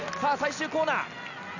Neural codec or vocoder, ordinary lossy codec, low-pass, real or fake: none; none; 7.2 kHz; real